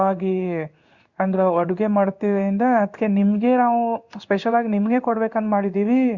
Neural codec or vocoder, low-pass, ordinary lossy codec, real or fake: codec, 16 kHz in and 24 kHz out, 1 kbps, XY-Tokenizer; 7.2 kHz; Opus, 64 kbps; fake